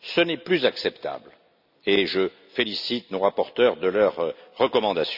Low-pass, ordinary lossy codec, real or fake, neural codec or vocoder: 5.4 kHz; none; real; none